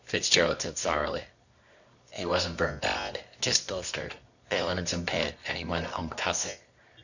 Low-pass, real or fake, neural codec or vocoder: 7.2 kHz; fake; codec, 24 kHz, 0.9 kbps, WavTokenizer, medium music audio release